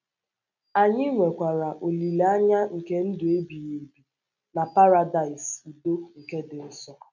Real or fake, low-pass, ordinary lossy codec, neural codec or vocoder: real; 7.2 kHz; none; none